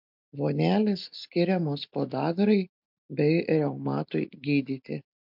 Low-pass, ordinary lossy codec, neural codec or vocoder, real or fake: 5.4 kHz; MP3, 48 kbps; none; real